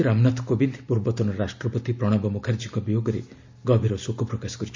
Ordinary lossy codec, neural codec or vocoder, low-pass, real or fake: MP3, 64 kbps; none; 7.2 kHz; real